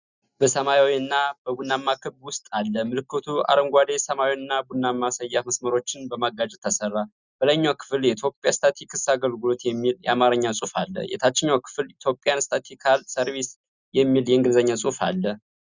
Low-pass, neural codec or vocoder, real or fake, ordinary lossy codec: 7.2 kHz; none; real; Opus, 64 kbps